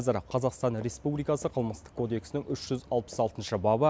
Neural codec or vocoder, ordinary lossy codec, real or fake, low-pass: none; none; real; none